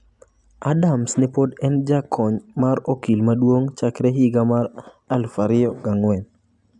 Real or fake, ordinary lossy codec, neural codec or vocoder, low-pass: real; none; none; 10.8 kHz